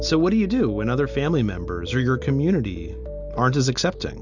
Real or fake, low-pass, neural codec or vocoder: real; 7.2 kHz; none